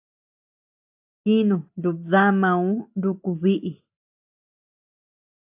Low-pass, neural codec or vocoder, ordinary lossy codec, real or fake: 3.6 kHz; none; AAC, 32 kbps; real